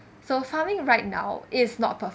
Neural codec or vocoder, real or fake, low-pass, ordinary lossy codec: none; real; none; none